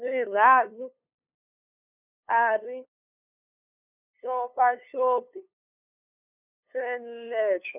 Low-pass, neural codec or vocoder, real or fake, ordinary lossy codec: 3.6 kHz; codec, 16 kHz, 2 kbps, FunCodec, trained on LibriTTS, 25 frames a second; fake; none